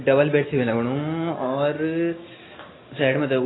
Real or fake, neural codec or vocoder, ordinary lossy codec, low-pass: real; none; AAC, 16 kbps; 7.2 kHz